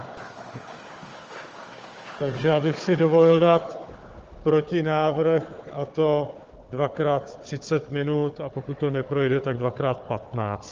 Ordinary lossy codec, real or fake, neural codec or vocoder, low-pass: Opus, 24 kbps; fake; codec, 16 kHz, 4 kbps, FunCodec, trained on Chinese and English, 50 frames a second; 7.2 kHz